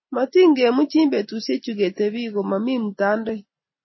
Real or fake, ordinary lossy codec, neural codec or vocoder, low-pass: real; MP3, 24 kbps; none; 7.2 kHz